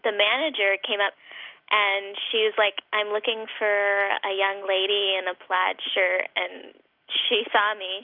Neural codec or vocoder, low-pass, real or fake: none; 5.4 kHz; real